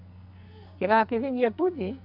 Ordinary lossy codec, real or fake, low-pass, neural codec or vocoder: none; fake; 5.4 kHz; codec, 44.1 kHz, 2.6 kbps, SNAC